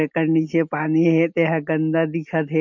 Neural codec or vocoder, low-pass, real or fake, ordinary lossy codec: none; 7.2 kHz; real; MP3, 64 kbps